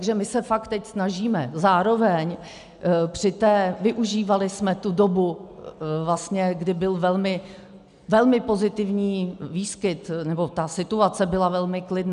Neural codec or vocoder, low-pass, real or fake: none; 10.8 kHz; real